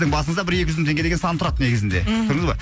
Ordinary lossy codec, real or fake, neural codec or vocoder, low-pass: none; real; none; none